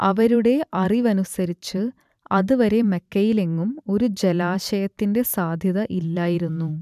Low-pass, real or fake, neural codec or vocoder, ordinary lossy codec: 14.4 kHz; fake; vocoder, 44.1 kHz, 128 mel bands every 512 samples, BigVGAN v2; none